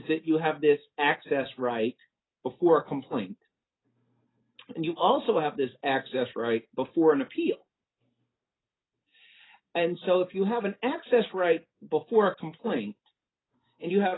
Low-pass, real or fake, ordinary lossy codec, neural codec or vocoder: 7.2 kHz; real; AAC, 16 kbps; none